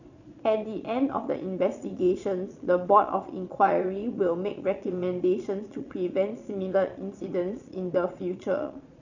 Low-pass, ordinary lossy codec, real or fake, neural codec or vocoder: 7.2 kHz; none; fake; vocoder, 22.05 kHz, 80 mel bands, Vocos